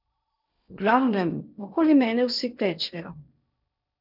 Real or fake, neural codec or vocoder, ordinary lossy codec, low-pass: fake; codec, 16 kHz in and 24 kHz out, 0.8 kbps, FocalCodec, streaming, 65536 codes; none; 5.4 kHz